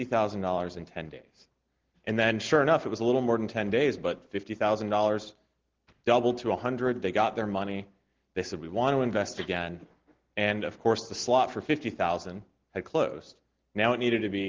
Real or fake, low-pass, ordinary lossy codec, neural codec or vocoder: real; 7.2 kHz; Opus, 16 kbps; none